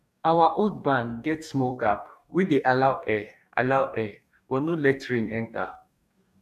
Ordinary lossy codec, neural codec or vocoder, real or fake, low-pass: none; codec, 44.1 kHz, 2.6 kbps, DAC; fake; 14.4 kHz